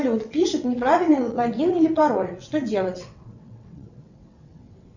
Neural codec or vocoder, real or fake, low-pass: vocoder, 22.05 kHz, 80 mel bands, WaveNeXt; fake; 7.2 kHz